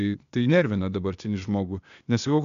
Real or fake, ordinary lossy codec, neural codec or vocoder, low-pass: fake; AAC, 96 kbps; codec, 16 kHz, 0.8 kbps, ZipCodec; 7.2 kHz